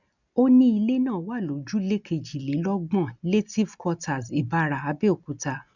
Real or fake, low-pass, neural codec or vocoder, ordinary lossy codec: real; 7.2 kHz; none; none